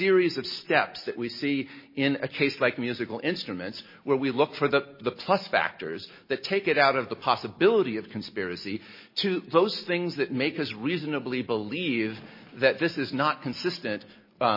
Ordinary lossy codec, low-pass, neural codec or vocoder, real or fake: MP3, 24 kbps; 5.4 kHz; none; real